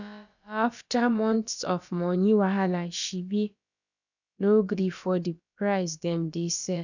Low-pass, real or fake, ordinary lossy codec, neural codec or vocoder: 7.2 kHz; fake; none; codec, 16 kHz, about 1 kbps, DyCAST, with the encoder's durations